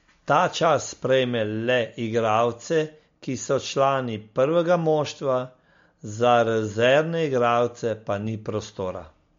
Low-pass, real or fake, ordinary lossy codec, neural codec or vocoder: 7.2 kHz; real; MP3, 48 kbps; none